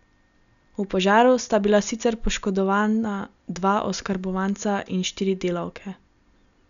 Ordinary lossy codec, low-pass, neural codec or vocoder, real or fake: none; 7.2 kHz; none; real